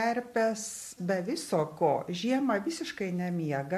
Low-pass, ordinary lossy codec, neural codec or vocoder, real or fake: 14.4 kHz; MP3, 64 kbps; none; real